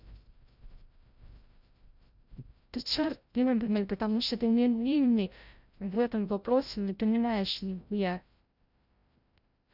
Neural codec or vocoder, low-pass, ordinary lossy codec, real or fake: codec, 16 kHz, 0.5 kbps, FreqCodec, larger model; 5.4 kHz; Opus, 64 kbps; fake